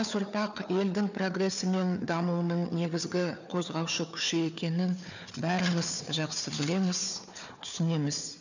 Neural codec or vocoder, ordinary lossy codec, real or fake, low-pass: codec, 16 kHz, 4 kbps, FunCodec, trained on Chinese and English, 50 frames a second; none; fake; 7.2 kHz